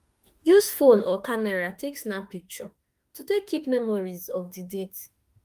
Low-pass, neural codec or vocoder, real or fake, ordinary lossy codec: 14.4 kHz; autoencoder, 48 kHz, 32 numbers a frame, DAC-VAE, trained on Japanese speech; fake; Opus, 32 kbps